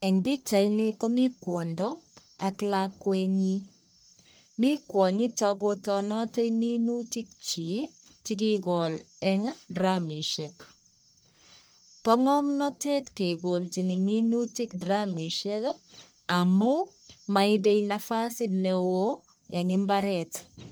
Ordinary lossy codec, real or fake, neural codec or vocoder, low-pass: none; fake; codec, 44.1 kHz, 1.7 kbps, Pupu-Codec; none